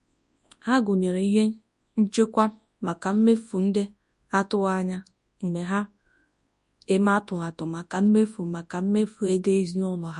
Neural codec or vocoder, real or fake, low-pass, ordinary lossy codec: codec, 24 kHz, 0.9 kbps, WavTokenizer, large speech release; fake; 10.8 kHz; MP3, 48 kbps